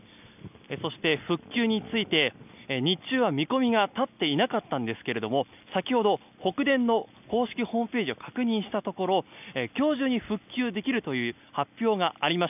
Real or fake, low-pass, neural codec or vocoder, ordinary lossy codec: real; 3.6 kHz; none; none